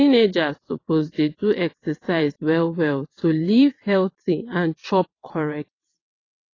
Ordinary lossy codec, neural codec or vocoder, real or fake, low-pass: AAC, 32 kbps; vocoder, 22.05 kHz, 80 mel bands, WaveNeXt; fake; 7.2 kHz